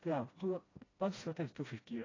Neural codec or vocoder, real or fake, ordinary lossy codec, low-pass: codec, 16 kHz, 1 kbps, FreqCodec, smaller model; fake; AAC, 32 kbps; 7.2 kHz